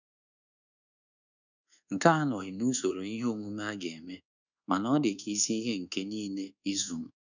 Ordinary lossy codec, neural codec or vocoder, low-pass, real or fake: none; codec, 24 kHz, 1.2 kbps, DualCodec; 7.2 kHz; fake